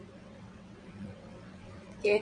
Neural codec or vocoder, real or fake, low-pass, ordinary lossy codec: vocoder, 22.05 kHz, 80 mel bands, WaveNeXt; fake; 9.9 kHz; MP3, 48 kbps